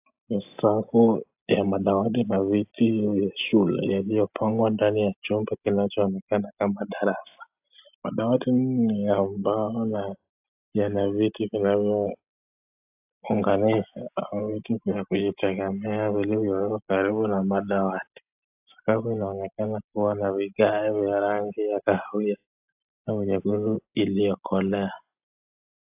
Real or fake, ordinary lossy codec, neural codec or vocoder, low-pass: real; AAC, 32 kbps; none; 3.6 kHz